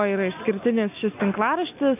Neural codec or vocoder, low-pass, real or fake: none; 3.6 kHz; real